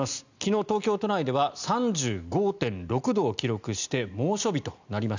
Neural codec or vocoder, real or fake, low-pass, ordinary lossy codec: none; real; 7.2 kHz; none